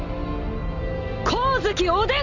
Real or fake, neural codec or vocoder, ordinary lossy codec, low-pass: real; none; Opus, 64 kbps; 7.2 kHz